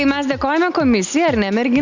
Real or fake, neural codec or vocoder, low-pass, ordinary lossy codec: real; none; 7.2 kHz; Opus, 64 kbps